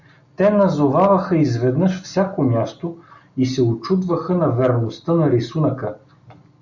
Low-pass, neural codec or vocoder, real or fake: 7.2 kHz; none; real